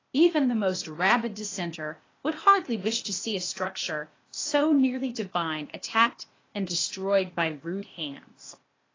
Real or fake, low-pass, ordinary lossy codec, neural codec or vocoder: fake; 7.2 kHz; AAC, 32 kbps; codec, 16 kHz, 0.8 kbps, ZipCodec